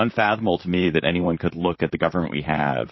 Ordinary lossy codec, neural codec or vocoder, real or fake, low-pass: MP3, 24 kbps; vocoder, 44.1 kHz, 128 mel bands, Pupu-Vocoder; fake; 7.2 kHz